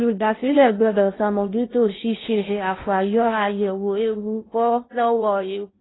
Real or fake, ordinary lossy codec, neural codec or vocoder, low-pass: fake; AAC, 16 kbps; codec, 16 kHz in and 24 kHz out, 0.6 kbps, FocalCodec, streaming, 2048 codes; 7.2 kHz